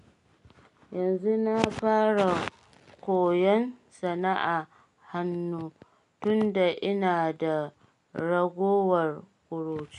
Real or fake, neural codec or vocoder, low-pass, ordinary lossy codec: real; none; 10.8 kHz; none